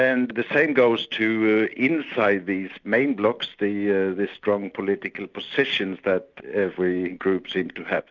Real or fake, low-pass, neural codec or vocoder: real; 7.2 kHz; none